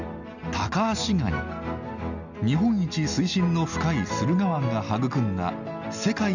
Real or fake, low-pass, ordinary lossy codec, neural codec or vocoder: real; 7.2 kHz; none; none